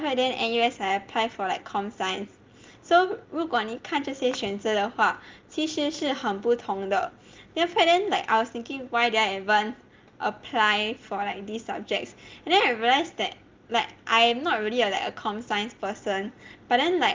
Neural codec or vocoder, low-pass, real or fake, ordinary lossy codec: none; 7.2 kHz; real; Opus, 32 kbps